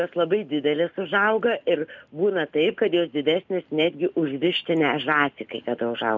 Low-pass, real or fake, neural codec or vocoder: 7.2 kHz; real; none